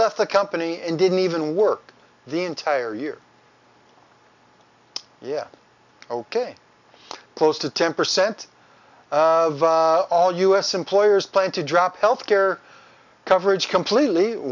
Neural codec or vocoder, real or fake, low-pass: none; real; 7.2 kHz